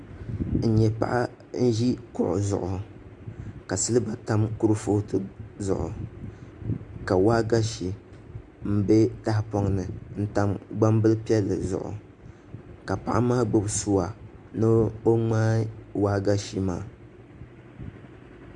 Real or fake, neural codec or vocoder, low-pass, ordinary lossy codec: real; none; 10.8 kHz; Opus, 64 kbps